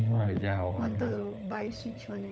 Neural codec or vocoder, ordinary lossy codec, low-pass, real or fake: codec, 16 kHz, 16 kbps, FunCodec, trained on LibriTTS, 50 frames a second; none; none; fake